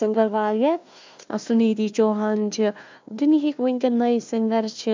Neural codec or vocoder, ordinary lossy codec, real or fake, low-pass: codec, 16 kHz, 1 kbps, FunCodec, trained on Chinese and English, 50 frames a second; MP3, 64 kbps; fake; 7.2 kHz